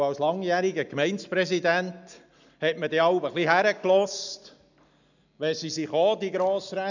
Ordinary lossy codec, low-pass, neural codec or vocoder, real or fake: none; 7.2 kHz; none; real